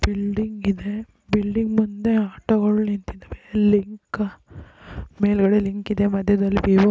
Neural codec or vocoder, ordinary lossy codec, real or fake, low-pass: none; none; real; none